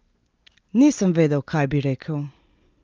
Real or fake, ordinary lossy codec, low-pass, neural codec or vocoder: real; Opus, 32 kbps; 7.2 kHz; none